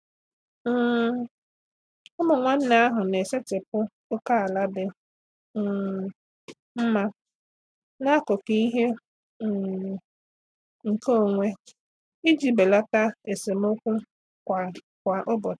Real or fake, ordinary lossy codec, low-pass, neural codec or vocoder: real; none; none; none